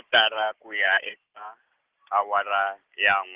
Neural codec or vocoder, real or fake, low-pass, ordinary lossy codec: none; real; 3.6 kHz; Opus, 16 kbps